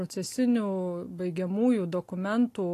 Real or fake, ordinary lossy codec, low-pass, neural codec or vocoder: real; AAC, 48 kbps; 14.4 kHz; none